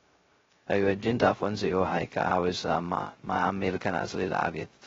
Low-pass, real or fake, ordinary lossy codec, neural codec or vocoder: 7.2 kHz; fake; AAC, 24 kbps; codec, 16 kHz, 0.2 kbps, FocalCodec